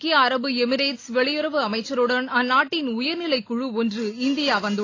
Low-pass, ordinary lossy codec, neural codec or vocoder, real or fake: 7.2 kHz; AAC, 32 kbps; none; real